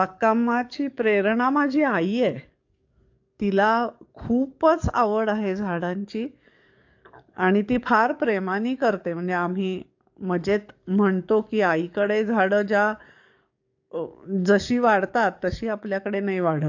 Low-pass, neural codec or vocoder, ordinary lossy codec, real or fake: 7.2 kHz; codec, 44.1 kHz, 7.8 kbps, DAC; AAC, 48 kbps; fake